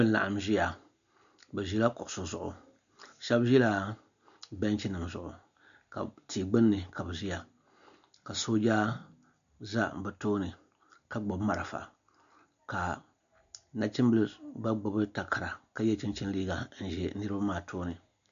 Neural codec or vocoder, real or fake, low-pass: none; real; 7.2 kHz